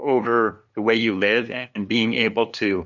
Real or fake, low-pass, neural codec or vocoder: fake; 7.2 kHz; codec, 16 kHz, 2 kbps, FunCodec, trained on LibriTTS, 25 frames a second